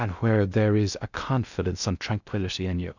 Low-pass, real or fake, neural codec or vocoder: 7.2 kHz; fake; codec, 16 kHz in and 24 kHz out, 0.6 kbps, FocalCodec, streaming, 2048 codes